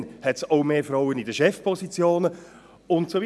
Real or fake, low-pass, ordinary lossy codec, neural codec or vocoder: real; none; none; none